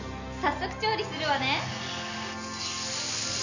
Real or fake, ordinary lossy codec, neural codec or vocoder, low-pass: real; none; none; 7.2 kHz